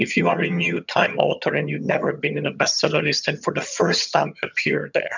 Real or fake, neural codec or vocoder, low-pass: fake; vocoder, 22.05 kHz, 80 mel bands, HiFi-GAN; 7.2 kHz